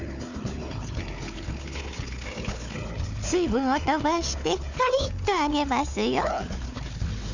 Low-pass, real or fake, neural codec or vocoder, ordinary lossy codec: 7.2 kHz; fake; codec, 16 kHz, 4 kbps, FunCodec, trained on Chinese and English, 50 frames a second; none